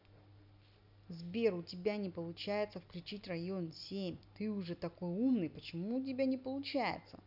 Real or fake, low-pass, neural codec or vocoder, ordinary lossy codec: real; 5.4 kHz; none; none